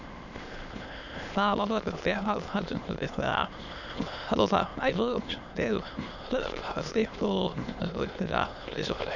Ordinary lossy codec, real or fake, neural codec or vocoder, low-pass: none; fake; autoencoder, 22.05 kHz, a latent of 192 numbers a frame, VITS, trained on many speakers; 7.2 kHz